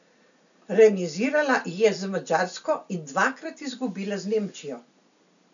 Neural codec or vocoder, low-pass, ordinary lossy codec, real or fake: none; 7.2 kHz; none; real